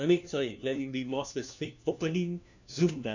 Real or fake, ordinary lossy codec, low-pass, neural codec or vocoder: fake; none; 7.2 kHz; codec, 16 kHz, 1 kbps, FunCodec, trained on LibriTTS, 50 frames a second